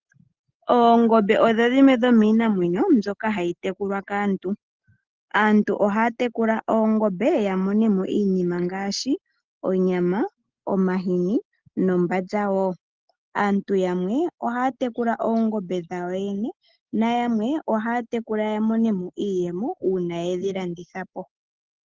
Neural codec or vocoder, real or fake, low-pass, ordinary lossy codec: none; real; 7.2 kHz; Opus, 16 kbps